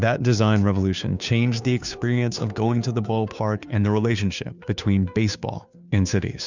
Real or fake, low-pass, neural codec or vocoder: fake; 7.2 kHz; codec, 16 kHz, 2 kbps, FunCodec, trained on Chinese and English, 25 frames a second